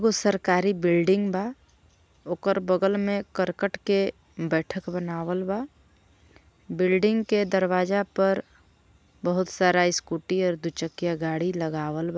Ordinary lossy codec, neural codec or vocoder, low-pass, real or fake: none; none; none; real